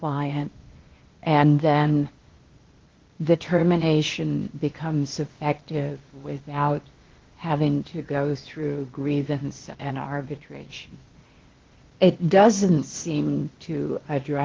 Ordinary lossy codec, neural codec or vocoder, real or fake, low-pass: Opus, 16 kbps; codec, 16 kHz, 0.8 kbps, ZipCodec; fake; 7.2 kHz